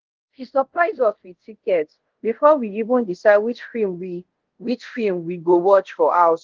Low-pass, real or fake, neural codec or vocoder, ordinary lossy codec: 7.2 kHz; fake; codec, 24 kHz, 0.5 kbps, DualCodec; Opus, 16 kbps